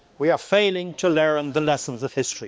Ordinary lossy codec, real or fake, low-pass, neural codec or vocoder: none; fake; none; codec, 16 kHz, 2 kbps, X-Codec, HuBERT features, trained on balanced general audio